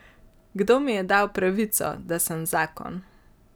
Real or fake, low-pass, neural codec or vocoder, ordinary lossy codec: real; none; none; none